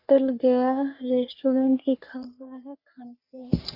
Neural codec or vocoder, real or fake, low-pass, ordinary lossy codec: codec, 44.1 kHz, 3.4 kbps, Pupu-Codec; fake; 5.4 kHz; Opus, 64 kbps